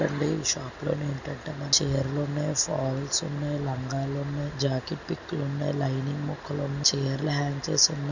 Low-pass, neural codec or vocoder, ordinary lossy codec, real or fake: 7.2 kHz; none; none; real